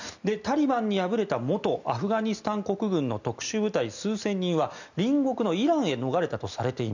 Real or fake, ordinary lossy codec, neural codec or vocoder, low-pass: real; none; none; 7.2 kHz